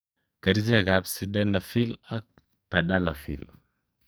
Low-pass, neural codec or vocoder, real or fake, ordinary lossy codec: none; codec, 44.1 kHz, 2.6 kbps, SNAC; fake; none